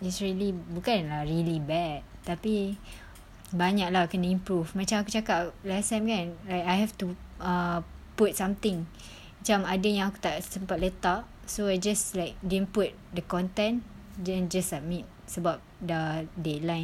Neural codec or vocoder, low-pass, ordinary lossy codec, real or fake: none; 19.8 kHz; none; real